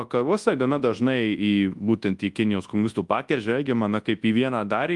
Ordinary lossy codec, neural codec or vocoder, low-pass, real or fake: Opus, 32 kbps; codec, 24 kHz, 0.9 kbps, WavTokenizer, large speech release; 10.8 kHz; fake